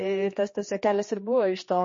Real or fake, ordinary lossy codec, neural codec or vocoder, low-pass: fake; MP3, 32 kbps; codec, 16 kHz, 2 kbps, X-Codec, HuBERT features, trained on general audio; 7.2 kHz